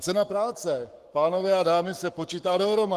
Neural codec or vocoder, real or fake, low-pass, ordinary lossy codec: codec, 44.1 kHz, 7.8 kbps, Pupu-Codec; fake; 14.4 kHz; Opus, 24 kbps